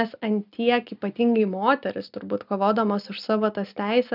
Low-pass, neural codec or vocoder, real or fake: 5.4 kHz; none; real